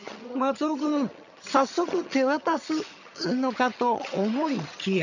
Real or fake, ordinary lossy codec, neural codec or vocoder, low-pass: fake; none; vocoder, 22.05 kHz, 80 mel bands, HiFi-GAN; 7.2 kHz